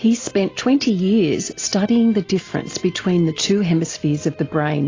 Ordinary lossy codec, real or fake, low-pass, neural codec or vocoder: AAC, 32 kbps; real; 7.2 kHz; none